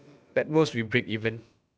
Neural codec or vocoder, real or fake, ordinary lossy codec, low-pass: codec, 16 kHz, about 1 kbps, DyCAST, with the encoder's durations; fake; none; none